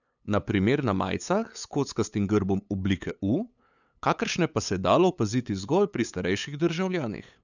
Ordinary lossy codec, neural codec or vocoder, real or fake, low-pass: none; codec, 16 kHz, 8 kbps, FunCodec, trained on LibriTTS, 25 frames a second; fake; 7.2 kHz